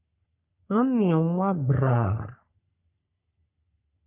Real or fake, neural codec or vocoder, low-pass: fake; codec, 44.1 kHz, 3.4 kbps, Pupu-Codec; 3.6 kHz